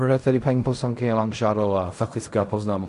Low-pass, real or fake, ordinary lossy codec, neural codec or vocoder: 10.8 kHz; fake; AAC, 64 kbps; codec, 16 kHz in and 24 kHz out, 0.4 kbps, LongCat-Audio-Codec, fine tuned four codebook decoder